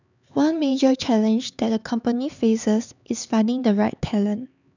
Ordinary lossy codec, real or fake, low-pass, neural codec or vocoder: none; fake; 7.2 kHz; codec, 16 kHz, 4 kbps, X-Codec, HuBERT features, trained on LibriSpeech